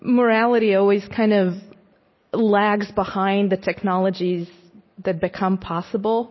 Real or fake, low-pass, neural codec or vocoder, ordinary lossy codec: real; 7.2 kHz; none; MP3, 24 kbps